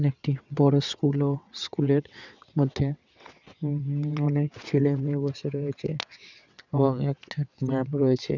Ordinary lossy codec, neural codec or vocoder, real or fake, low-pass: none; vocoder, 22.05 kHz, 80 mel bands, WaveNeXt; fake; 7.2 kHz